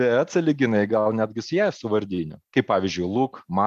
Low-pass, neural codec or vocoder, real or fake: 14.4 kHz; none; real